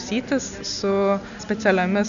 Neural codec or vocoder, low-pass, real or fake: none; 7.2 kHz; real